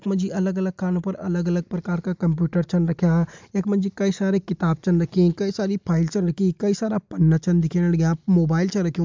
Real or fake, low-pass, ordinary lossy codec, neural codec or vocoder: real; 7.2 kHz; MP3, 64 kbps; none